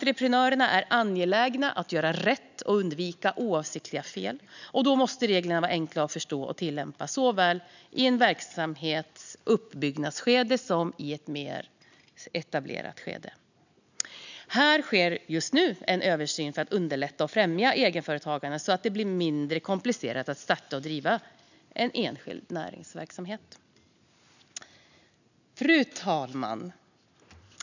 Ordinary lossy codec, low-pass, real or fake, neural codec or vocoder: none; 7.2 kHz; real; none